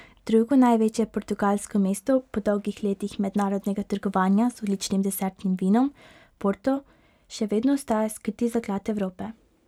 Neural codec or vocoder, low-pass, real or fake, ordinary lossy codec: none; 19.8 kHz; real; none